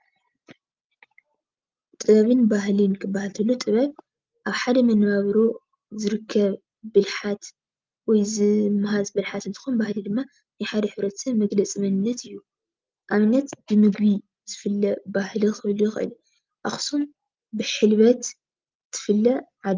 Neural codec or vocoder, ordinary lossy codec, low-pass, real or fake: none; Opus, 32 kbps; 7.2 kHz; real